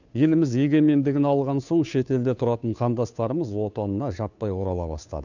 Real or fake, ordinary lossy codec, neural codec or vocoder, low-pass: fake; none; codec, 16 kHz, 2 kbps, FunCodec, trained on Chinese and English, 25 frames a second; 7.2 kHz